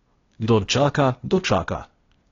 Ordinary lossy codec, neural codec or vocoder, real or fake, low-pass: AAC, 32 kbps; codec, 16 kHz, 0.8 kbps, ZipCodec; fake; 7.2 kHz